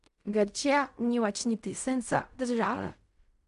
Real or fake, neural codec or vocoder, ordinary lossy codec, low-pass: fake; codec, 16 kHz in and 24 kHz out, 0.4 kbps, LongCat-Audio-Codec, fine tuned four codebook decoder; MP3, 96 kbps; 10.8 kHz